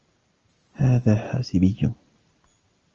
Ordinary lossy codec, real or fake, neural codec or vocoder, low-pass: Opus, 24 kbps; real; none; 7.2 kHz